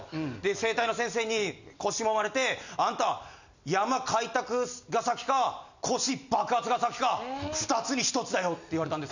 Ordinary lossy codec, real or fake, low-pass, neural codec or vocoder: none; real; 7.2 kHz; none